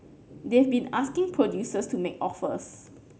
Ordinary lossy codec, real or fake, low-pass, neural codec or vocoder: none; real; none; none